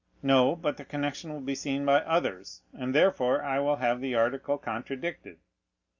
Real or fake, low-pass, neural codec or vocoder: real; 7.2 kHz; none